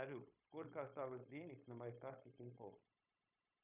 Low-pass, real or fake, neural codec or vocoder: 3.6 kHz; fake; codec, 16 kHz, 0.9 kbps, LongCat-Audio-Codec